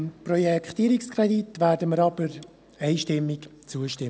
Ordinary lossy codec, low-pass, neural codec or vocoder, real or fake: none; none; none; real